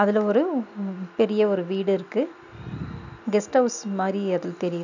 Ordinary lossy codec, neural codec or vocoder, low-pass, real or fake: none; none; 7.2 kHz; real